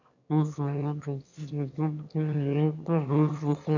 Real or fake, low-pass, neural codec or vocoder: fake; 7.2 kHz; autoencoder, 22.05 kHz, a latent of 192 numbers a frame, VITS, trained on one speaker